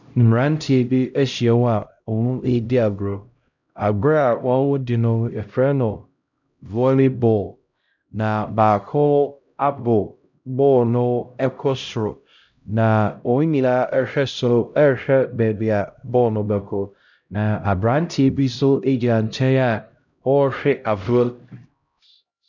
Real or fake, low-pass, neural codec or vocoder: fake; 7.2 kHz; codec, 16 kHz, 0.5 kbps, X-Codec, HuBERT features, trained on LibriSpeech